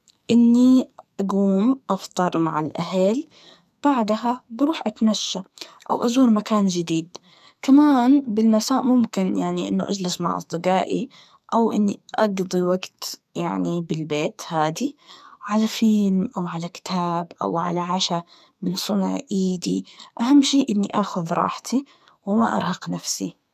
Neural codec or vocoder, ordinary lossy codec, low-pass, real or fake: codec, 44.1 kHz, 2.6 kbps, SNAC; none; 14.4 kHz; fake